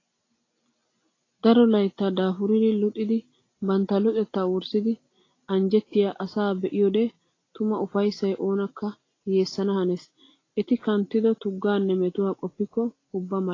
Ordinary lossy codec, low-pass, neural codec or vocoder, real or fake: AAC, 32 kbps; 7.2 kHz; none; real